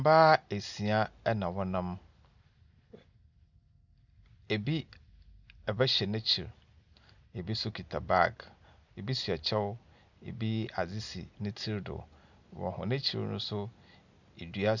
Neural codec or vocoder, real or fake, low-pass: none; real; 7.2 kHz